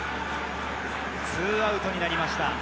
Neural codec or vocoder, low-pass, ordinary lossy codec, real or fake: none; none; none; real